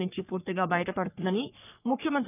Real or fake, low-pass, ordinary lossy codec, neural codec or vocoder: fake; 3.6 kHz; none; codec, 44.1 kHz, 3.4 kbps, Pupu-Codec